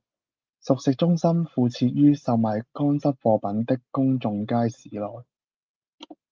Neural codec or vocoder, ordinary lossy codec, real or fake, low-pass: none; Opus, 32 kbps; real; 7.2 kHz